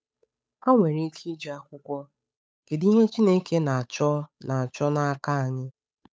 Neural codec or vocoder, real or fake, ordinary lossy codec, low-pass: codec, 16 kHz, 8 kbps, FunCodec, trained on Chinese and English, 25 frames a second; fake; none; none